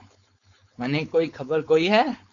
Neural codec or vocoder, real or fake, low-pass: codec, 16 kHz, 4.8 kbps, FACodec; fake; 7.2 kHz